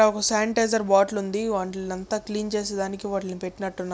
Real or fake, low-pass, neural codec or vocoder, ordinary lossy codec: real; none; none; none